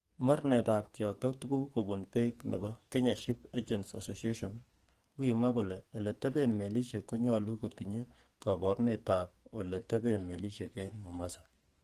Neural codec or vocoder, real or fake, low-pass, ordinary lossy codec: codec, 44.1 kHz, 2.6 kbps, SNAC; fake; 14.4 kHz; Opus, 24 kbps